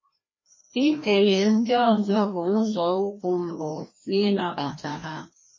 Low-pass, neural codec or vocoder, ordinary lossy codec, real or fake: 7.2 kHz; codec, 16 kHz, 1 kbps, FreqCodec, larger model; MP3, 32 kbps; fake